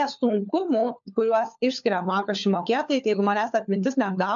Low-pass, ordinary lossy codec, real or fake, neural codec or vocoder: 7.2 kHz; MP3, 64 kbps; fake; codec, 16 kHz, 4 kbps, FunCodec, trained on LibriTTS, 50 frames a second